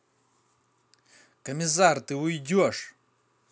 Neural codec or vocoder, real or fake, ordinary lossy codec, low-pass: none; real; none; none